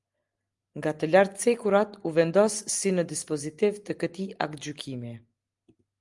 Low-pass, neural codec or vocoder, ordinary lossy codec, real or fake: 10.8 kHz; none; Opus, 32 kbps; real